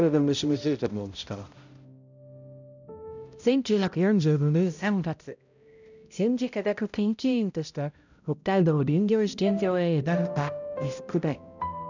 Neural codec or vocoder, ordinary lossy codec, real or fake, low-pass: codec, 16 kHz, 0.5 kbps, X-Codec, HuBERT features, trained on balanced general audio; none; fake; 7.2 kHz